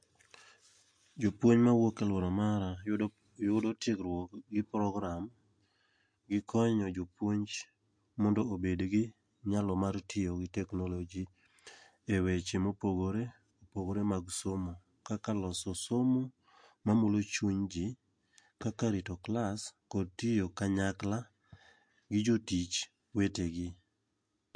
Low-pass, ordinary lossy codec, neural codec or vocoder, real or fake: 9.9 kHz; MP3, 48 kbps; none; real